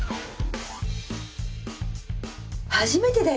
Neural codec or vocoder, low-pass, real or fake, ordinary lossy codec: none; none; real; none